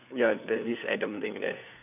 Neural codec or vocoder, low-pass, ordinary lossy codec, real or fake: codec, 16 kHz, 4 kbps, FunCodec, trained on LibriTTS, 50 frames a second; 3.6 kHz; none; fake